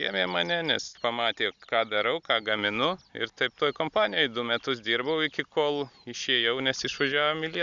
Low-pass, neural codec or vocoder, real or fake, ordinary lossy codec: 7.2 kHz; none; real; Opus, 64 kbps